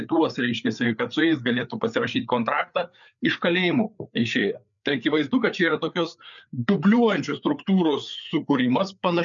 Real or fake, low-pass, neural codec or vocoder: fake; 7.2 kHz; codec, 16 kHz, 4 kbps, FreqCodec, larger model